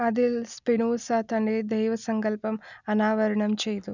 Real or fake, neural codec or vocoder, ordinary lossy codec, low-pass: real; none; none; 7.2 kHz